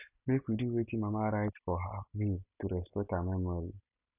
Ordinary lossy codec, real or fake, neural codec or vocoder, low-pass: none; real; none; 3.6 kHz